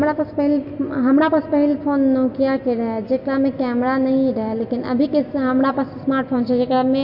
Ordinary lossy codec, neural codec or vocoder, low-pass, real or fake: MP3, 32 kbps; none; 5.4 kHz; real